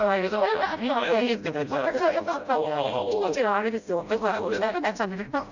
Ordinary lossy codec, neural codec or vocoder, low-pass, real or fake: none; codec, 16 kHz, 0.5 kbps, FreqCodec, smaller model; 7.2 kHz; fake